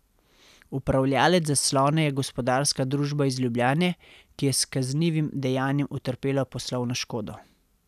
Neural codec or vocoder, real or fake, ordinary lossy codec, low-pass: none; real; none; 14.4 kHz